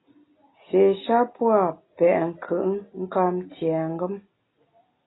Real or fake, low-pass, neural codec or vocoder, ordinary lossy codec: real; 7.2 kHz; none; AAC, 16 kbps